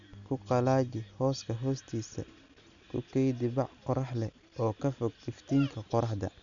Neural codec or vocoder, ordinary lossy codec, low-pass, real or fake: none; none; 7.2 kHz; real